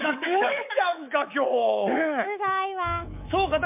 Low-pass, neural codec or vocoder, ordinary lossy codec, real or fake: 3.6 kHz; codec, 24 kHz, 3.1 kbps, DualCodec; MP3, 32 kbps; fake